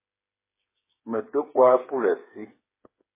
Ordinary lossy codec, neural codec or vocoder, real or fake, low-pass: MP3, 16 kbps; codec, 16 kHz, 8 kbps, FreqCodec, smaller model; fake; 3.6 kHz